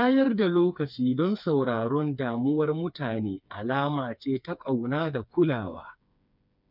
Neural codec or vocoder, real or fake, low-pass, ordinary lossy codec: codec, 16 kHz, 4 kbps, FreqCodec, smaller model; fake; 5.4 kHz; none